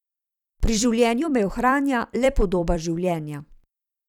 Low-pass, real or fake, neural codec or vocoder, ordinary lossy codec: 19.8 kHz; fake; vocoder, 44.1 kHz, 128 mel bands every 256 samples, BigVGAN v2; none